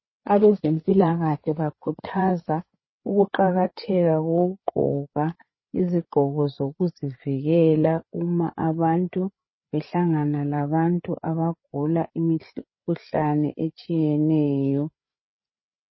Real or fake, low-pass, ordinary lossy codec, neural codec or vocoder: fake; 7.2 kHz; MP3, 24 kbps; codec, 16 kHz, 8 kbps, FreqCodec, larger model